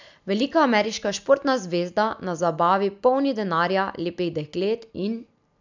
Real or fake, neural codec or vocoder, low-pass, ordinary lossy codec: real; none; 7.2 kHz; none